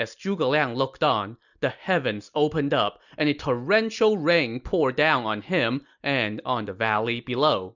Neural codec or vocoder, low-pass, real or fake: none; 7.2 kHz; real